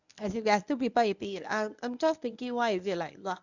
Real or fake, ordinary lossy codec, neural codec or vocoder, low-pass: fake; none; codec, 24 kHz, 0.9 kbps, WavTokenizer, medium speech release version 1; 7.2 kHz